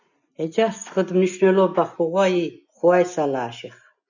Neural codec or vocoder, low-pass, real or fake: none; 7.2 kHz; real